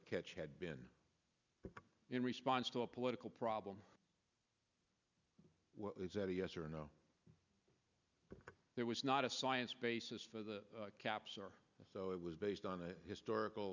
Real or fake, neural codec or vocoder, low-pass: real; none; 7.2 kHz